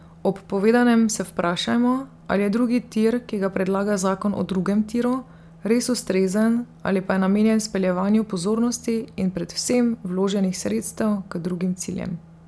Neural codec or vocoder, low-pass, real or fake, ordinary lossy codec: none; none; real; none